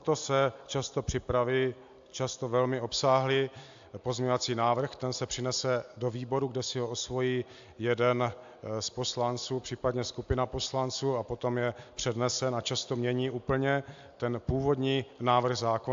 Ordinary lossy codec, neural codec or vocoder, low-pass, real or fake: AAC, 64 kbps; none; 7.2 kHz; real